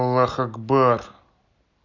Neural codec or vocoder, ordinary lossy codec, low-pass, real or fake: none; none; 7.2 kHz; real